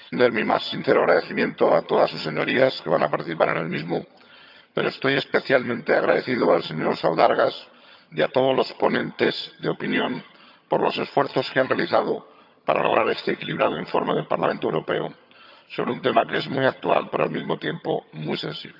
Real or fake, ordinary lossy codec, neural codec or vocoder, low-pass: fake; none; vocoder, 22.05 kHz, 80 mel bands, HiFi-GAN; 5.4 kHz